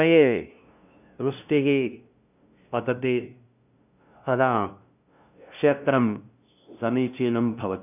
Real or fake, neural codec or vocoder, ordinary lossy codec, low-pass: fake; codec, 16 kHz, 0.5 kbps, FunCodec, trained on LibriTTS, 25 frames a second; none; 3.6 kHz